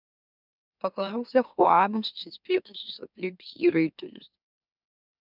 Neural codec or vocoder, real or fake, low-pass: autoencoder, 44.1 kHz, a latent of 192 numbers a frame, MeloTTS; fake; 5.4 kHz